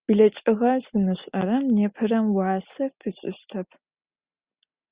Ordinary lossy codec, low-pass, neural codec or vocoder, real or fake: Opus, 64 kbps; 3.6 kHz; none; real